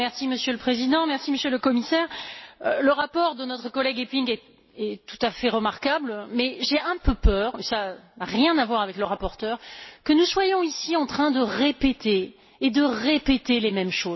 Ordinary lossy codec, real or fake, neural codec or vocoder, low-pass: MP3, 24 kbps; real; none; 7.2 kHz